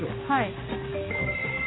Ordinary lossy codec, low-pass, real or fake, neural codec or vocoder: AAC, 16 kbps; 7.2 kHz; real; none